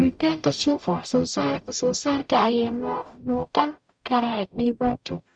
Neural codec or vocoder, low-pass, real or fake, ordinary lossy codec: codec, 44.1 kHz, 0.9 kbps, DAC; 9.9 kHz; fake; Opus, 64 kbps